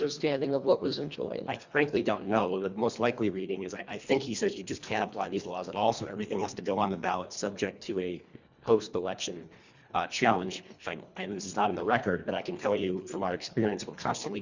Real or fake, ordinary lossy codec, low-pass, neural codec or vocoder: fake; Opus, 64 kbps; 7.2 kHz; codec, 24 kHz, 1.5 kbps, HILCodec